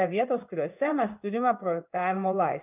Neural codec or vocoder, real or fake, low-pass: codec, 16 kHz in and 24 kHz out, 1 kbps, XY-Tokenizer; fake; 3.6 kHz